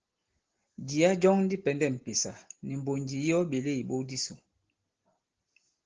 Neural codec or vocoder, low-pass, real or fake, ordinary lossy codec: none; 7.2 kHz; real; Opus, 16 kbps